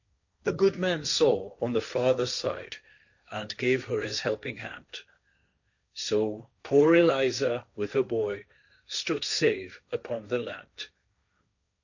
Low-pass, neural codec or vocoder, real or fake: 7.2 kHz; codec, 16 kHz, 1.1 kbps, Voila-Tokenizer; fake